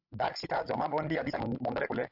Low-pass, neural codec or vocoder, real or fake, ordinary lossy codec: 5.4 kHz; codec, 16 kHz, 16 kbps, FreqCodec, larger model; fake; AAC, 48 kbps